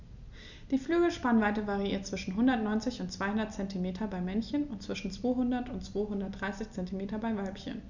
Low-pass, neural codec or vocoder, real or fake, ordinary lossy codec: 7.2 kHz; none; real; none